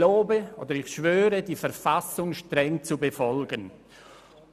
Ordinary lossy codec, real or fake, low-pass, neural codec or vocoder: none; real; 14.4 kHz; none